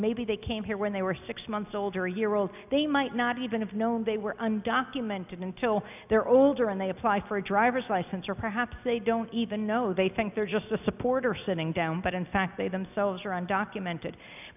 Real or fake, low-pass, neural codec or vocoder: real; 3.6 kHz; none